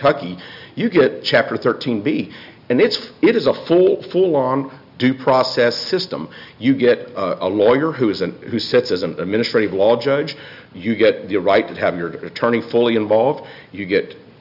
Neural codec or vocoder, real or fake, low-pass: none; real; 5.4 kHz